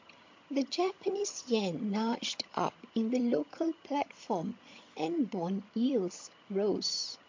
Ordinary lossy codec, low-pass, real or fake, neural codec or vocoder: MP3, 48 kbps; 7.2 kHz; fake; vocoder, 22.05 kHz, 80 mel bands, HiFi-GAN